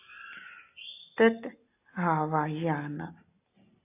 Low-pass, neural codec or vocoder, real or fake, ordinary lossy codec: 3.6 kHz; none; real; AAC, 24 kbps